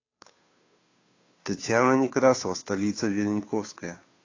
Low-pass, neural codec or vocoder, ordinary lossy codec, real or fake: 7.2 kHz; codec, 16 kHz, 2 kbps, FunCodec, trained on Chinese and English, 25 frames a second; AAC, 32 kbps; fake